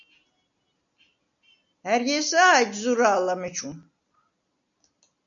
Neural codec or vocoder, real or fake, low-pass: none; real; 7.2 kHz